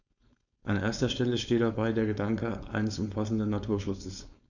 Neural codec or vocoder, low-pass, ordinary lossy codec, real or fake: codec, 16 kHz, 4.8 kbps, FACodec; 7.2 kHz; none; fake